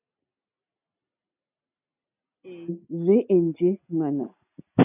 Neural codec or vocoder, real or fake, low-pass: none; real; 3.6 kHz